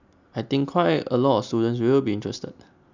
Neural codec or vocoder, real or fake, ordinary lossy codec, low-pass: none; real; none; 7.2 kHz